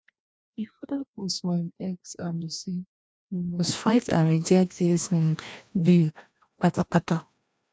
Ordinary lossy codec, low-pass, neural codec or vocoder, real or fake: none; none; codec, 16 kHz, 1 kbps, FreqCodec, larger model; fake